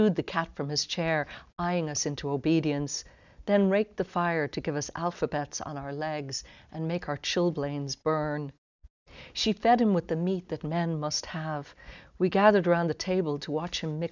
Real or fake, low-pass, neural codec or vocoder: real; 7.2 kHz; none